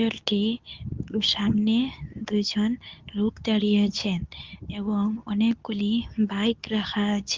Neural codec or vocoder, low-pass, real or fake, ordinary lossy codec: codec, 24 kHz, 0.9 kbps, WavTokenizer, medium speech release version 2; 7.2 kHz; fake; Opus, 24 kbps